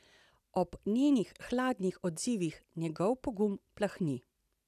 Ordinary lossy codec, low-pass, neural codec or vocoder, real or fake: none; 14.4 kHz; none; real